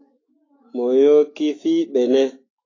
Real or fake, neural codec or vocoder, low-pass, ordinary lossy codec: fake; autoencoder, 48 kHz, 128 numbers a frame, DAC-VAE, trained on Japanese speech; 7.2 kHz; AAC, 32 kbps